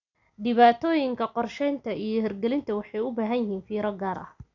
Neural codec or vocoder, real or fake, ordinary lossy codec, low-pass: none; real; none; 7.2 kHz